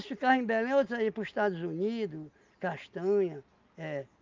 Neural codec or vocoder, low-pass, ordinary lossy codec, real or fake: none; 7.2 kHz; Opus, 24 kbps; real